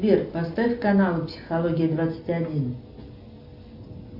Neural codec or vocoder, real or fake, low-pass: none; real; 5.4 kHz